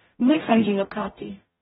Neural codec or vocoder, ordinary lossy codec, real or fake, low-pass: codec, 44.1 kHz, 0.9 kbps, DAC; AAC, 16 kbps; fake; 19.8 kHz